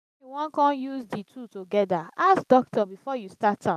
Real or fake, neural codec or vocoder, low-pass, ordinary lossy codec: fake; vocoder, 44.1 kHz, 128 mel bands every 512 samples, BigVGAN v2; 14.4 kHz; none